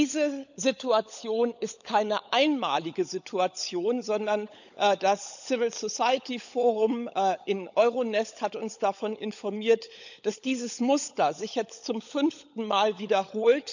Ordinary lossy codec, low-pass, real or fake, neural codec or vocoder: none; 7.2 kHz; fake; codec, 16 kHz, 16 kbps, FunCodec, trained on LibriTTS, 50 frames a second